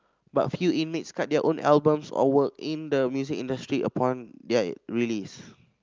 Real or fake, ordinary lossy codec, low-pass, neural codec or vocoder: real; Opus, 24 kbps; 7.2 kHz; none